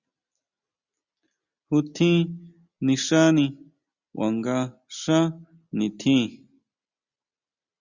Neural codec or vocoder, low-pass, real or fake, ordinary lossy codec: none; 7.2 kHz; real; Opus, 64 kbps